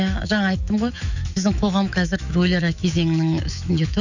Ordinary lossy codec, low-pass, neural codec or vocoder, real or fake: none; 7.2 kHz; autoencoder, 48 kHz, 128 numbers a frame, DAC-VAE, trained on Japanese speech; fake